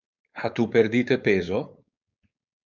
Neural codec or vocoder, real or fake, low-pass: codec, 16 kHz, 4.8 kbps, FACodec; fake; 7.2 kHz